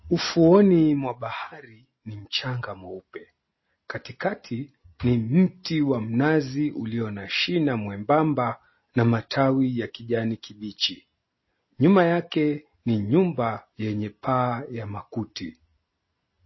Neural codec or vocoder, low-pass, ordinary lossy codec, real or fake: none; 7.2 kHz; MP3, 24 kbps; real